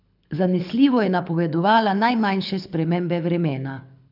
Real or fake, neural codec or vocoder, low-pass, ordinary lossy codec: fake; codec, 24 kHz, 6 kbps, HILCodec; 5.4 kHz; none